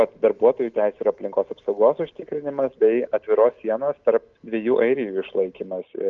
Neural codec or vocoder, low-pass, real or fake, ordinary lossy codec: none; 7.2 kHz; real; Opus, 24 kbps